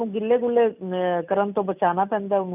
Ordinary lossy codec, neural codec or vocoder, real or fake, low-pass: none; none; real; 3.6 kHz